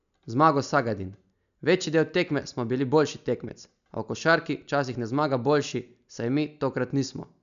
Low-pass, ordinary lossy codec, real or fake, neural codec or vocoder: 7.2 kHz; none; real; none